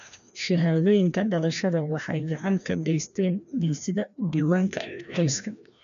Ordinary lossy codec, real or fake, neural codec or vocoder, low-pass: none; fake; codec, 16 kHz, 1 kbps, FreqCodec, larger model; 7.2 kHz